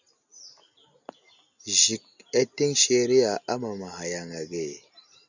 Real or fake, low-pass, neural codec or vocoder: real; 7.2 kHz; none